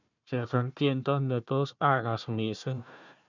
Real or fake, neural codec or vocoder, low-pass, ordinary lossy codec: fake; codec, 16 kHz, 1 kbps, FunCodec, trained on Chinese and English, 50 frames a second; 7.2 kHz; none